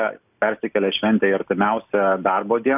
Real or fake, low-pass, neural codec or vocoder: real; 3.6 kHz; none